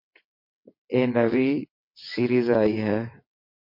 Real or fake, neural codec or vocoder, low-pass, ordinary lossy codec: fake; vocoder, 22.05 kHz, 80 mel bands, WaveNeXt; 5.4 kHz; MP3, 32 kbps